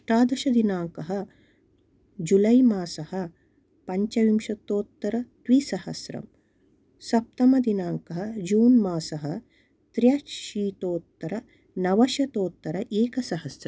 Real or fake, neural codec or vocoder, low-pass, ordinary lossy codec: real; none; none; none